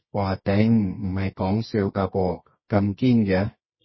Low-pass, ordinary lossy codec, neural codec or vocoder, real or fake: 7.2 kHz; MP3, 24 kbps; codec, 24 kHz, 0.9 kbps, WavTokenizer, medium music audio release; fake